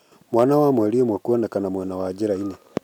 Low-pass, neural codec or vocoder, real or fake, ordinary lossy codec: 19.8 kHz; none; real; none